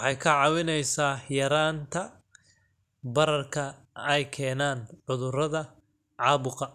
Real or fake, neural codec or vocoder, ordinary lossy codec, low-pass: real; none; none; 14.4 kHz